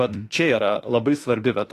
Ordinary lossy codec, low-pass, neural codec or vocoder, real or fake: AAC, 48 kbps; 14.4 kHz; autoencoder, 48 kHz, 32 numbers a frame, DAC-VAE, trained on Japanese speech; fake